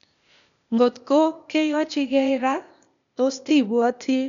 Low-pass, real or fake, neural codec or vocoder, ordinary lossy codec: 7.2 kHz; fake; codec, 16 kHz, 0.8 kbps, ZipCodec; none